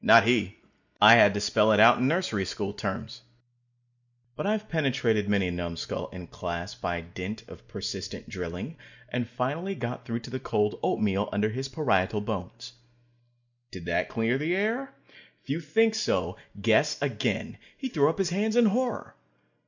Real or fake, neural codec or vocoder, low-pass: real; none; 7.2 kHz